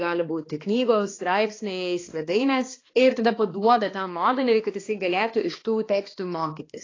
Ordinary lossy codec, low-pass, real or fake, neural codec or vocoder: AAC, 32 kbps; 7.2 kHz; fake; codec, 16 kHz, 2 kbps, X-Codec, HuBERT features, trained on balanced general audio